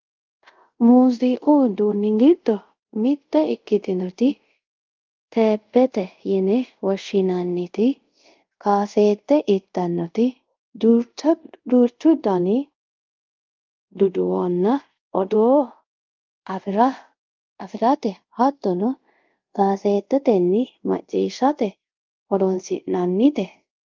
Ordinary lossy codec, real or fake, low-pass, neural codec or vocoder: Opus, 32 kbps; fake; 7.2 kHz; codec, 24 kHz, 0.5 kbps, DualCodec